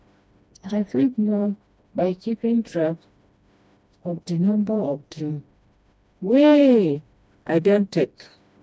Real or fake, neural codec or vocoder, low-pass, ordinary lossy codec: fake; codec, 16 kHz, 1 kbps, FreqCodec, smaller model; none; none